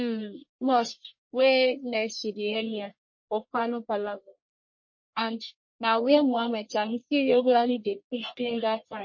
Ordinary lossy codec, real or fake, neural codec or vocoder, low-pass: MP3, 32 kbps; fake; codec, 44.1 kHz, 1.7 kbps, Pupu-Codec; 7.2 kHz